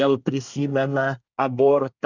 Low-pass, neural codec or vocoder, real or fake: 7.2 kHz; codec, 24 kHz, 1 kbps, SNAC; fake